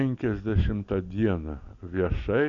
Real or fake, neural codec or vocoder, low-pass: real; none; 7.2 kHz